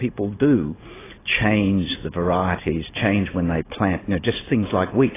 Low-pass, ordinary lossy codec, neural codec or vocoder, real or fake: 3.6 kHz; AAC, 16 kbps; none; real